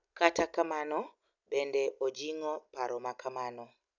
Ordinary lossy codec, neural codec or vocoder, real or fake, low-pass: none; none; real; 7.2 kHz